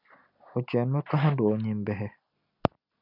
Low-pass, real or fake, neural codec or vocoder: 5.4 kHz; real; none